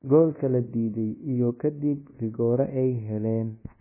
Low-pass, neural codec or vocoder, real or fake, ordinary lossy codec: 3.6 kHz; codec, 24 kHz, 1.2 kbps, DualCodec; fake; MP3, 16 kbps